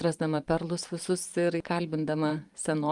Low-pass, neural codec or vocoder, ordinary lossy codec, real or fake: 10.8 kHz; vocoder, 44.1 kHz, 128 mel bands every 512 samples, BigVGAN v2; Opus, 32 kbps; fake